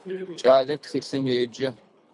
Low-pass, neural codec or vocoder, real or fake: 10.8 kHz; codec, 24 kHz, 3 kbps, HILCodec; fake